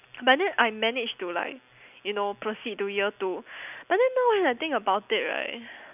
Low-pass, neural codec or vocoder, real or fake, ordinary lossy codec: 3.6 kHz; none; real; none